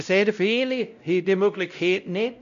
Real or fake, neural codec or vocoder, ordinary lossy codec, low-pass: fake; codec, 16 kHz, 0.5 kbps, X-Codec, WavLM features, trained on Multilingual LibriSpeech; none; 7.2 kHz